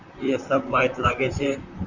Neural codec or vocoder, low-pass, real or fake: vocoder, 44.1 kHz, 128 mel bands, Pupu-Vocoder; 7.2 kHz; fake